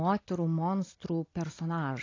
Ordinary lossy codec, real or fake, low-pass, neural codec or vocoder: AAC, 32 kbps; real; 7.2 kHz; none